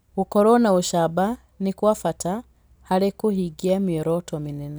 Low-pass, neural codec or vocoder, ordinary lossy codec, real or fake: none; none; none; real